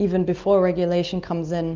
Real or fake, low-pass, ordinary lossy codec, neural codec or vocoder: real; 7.2 kHz; Opus, 24 kbps; none